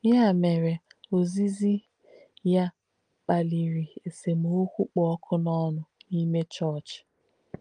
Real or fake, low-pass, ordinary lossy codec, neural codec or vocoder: real; 9.9 kHz; Opus, 32 kbps; none